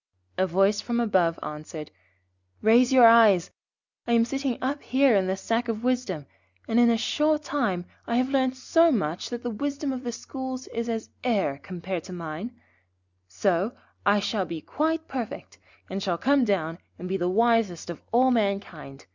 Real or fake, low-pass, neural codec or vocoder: real; 7.2 kHz; none